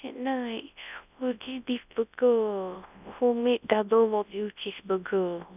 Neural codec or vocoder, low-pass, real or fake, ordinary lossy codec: codec, 24 kHz, 0.9 kbps, WavTokenizer, large speech release; 3.6 kHz; fake; none